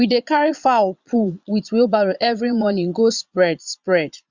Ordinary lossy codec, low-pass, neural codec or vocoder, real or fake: Opus, 64 kbps; 7.2 kHz; vocoder, 22.05 kHz, 80 mel bands, Vocos; fake